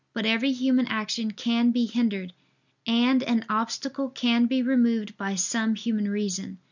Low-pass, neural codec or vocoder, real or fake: 7.2 kHz; none; real